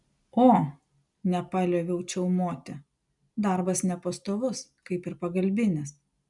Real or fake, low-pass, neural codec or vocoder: real; 10.8 kHz; none